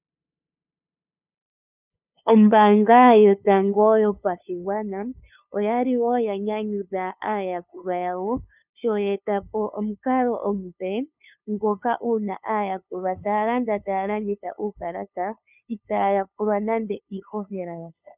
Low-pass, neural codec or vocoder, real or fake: 3.6 kHz; codec, 16 kHz, 2 kbps, FunCodec, trained on LibriTTS, 25 frames a second; fake